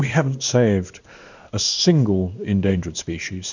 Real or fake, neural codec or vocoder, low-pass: fake; codec, 16 kHz in and 24 kHz out, 1 kbps, XY-Tokenizer; 7.2 kHz